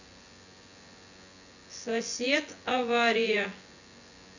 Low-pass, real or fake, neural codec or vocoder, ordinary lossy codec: 7.2 kHz; fake; vocoder, 24 kHz, 100 mel bands, Vocos; none